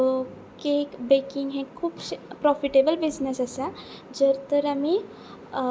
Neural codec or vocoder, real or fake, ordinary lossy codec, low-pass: none; real; none; none